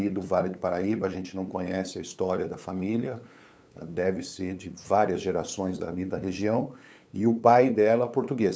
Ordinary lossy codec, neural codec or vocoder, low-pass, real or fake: none; codec, 16 kHz, 8 kbps, FunCodec, trained on LibriTTS, 25 frames a second; none; fake